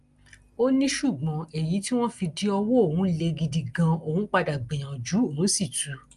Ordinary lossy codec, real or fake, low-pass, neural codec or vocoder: Opus, 32 kbps; real; 10.8 kHz; none